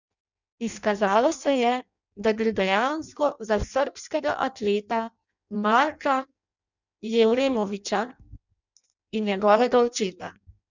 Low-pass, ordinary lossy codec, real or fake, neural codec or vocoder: 7.2 kHz; none; fake; codec, 16 kHz in and 24 kHz out, 0.6 kbps, FireRedTTS-2 codec